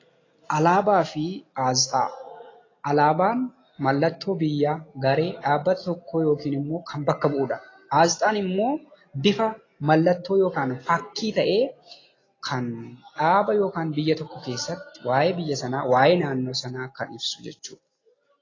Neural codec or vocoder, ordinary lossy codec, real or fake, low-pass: none; AAC, 32 kbps; real; 7.2 kHz